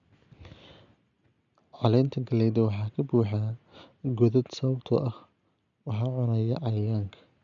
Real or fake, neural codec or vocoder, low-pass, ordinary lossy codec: real; none; 7.2 kHz; MP3, 96 kbps